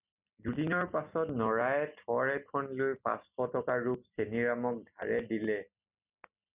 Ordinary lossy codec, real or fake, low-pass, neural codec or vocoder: Opus, 64 kbps; real; 3.6 kHz; none